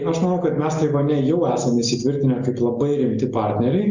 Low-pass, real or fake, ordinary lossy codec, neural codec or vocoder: 7.2 kHz; real; Opus, 64 kbps; none